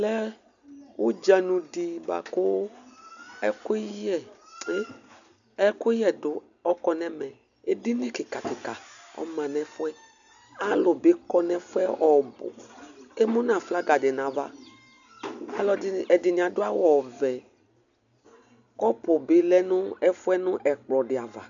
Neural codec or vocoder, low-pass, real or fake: none; 7.2 kHz; real